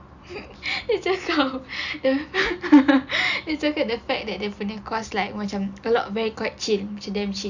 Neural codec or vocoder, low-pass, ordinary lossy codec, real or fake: none; 7.2 kHz; none; real